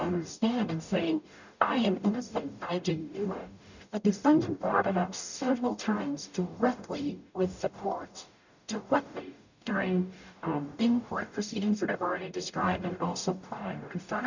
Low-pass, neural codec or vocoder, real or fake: 7.2 kHz; codec, 44.1 kHz, 0.9 kbps, DAC; fake